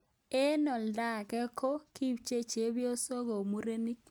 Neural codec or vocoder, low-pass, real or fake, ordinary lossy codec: none; none; real; none